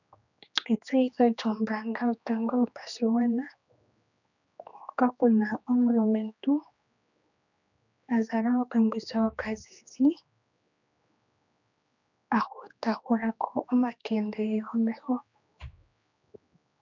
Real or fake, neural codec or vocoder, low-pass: fake; codec, 16 kHz, 2 kbps, X-Codec, HuBERT features, trained on general audio; 7.2 kHz